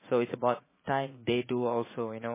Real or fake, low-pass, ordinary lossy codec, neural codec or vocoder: fake; 3.6 kHz; MP3, 16 kbps; vocoder, 44.1 kHz, 80 mel bands, Vocos